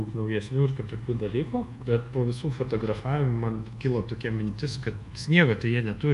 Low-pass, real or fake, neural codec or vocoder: 10.8 kHz; fake; codec, 24 kHz, 1.2 kbps, DualCodec